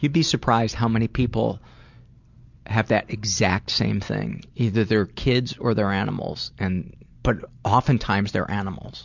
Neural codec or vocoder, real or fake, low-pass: none; real; 7.2 kHz